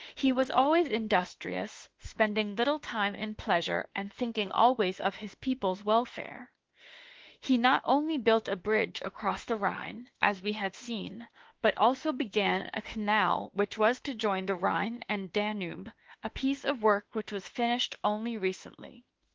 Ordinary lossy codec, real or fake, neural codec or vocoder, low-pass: Opus, 16 kbps; fake; autoencoder, 48 kHz, 32 numbers a frame, DAC-VAE, trained on Japanese speech; 7.2 kHz